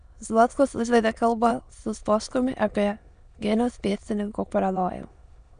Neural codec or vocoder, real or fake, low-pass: autoencoder, 22.05 kHz, a latent of 192 numbers a frame, VITS, trained on many speakers; fake; 9.9 kHz